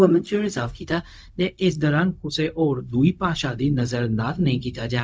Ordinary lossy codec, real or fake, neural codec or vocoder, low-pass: none; fake; codec, 16 kHz, 0.4 kbps, LongCat-Audio-Codec; none